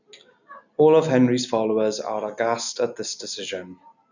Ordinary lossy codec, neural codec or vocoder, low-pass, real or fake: none; none; 7.2 kHz; real